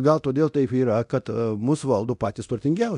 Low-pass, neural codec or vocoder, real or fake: 10.8 kHz; codec, 24 kHz, 0.9 kbps, DualCodec; fake